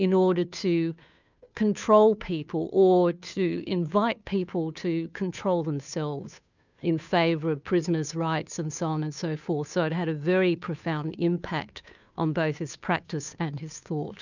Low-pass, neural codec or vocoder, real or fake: 7.2 kHz; codec, 16 kHz, 2 kbps, FunCodec, trained on Chinese and English, 25 frames a second; fake